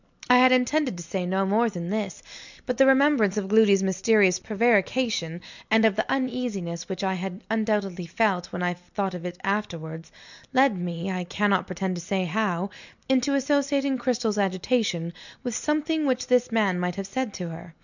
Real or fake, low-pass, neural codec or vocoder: real; 7.2 kHz; none